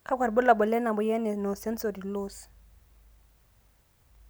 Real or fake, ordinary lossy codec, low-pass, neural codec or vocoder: real; none; none; none